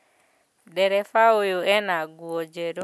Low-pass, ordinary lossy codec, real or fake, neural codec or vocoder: none; none; real; none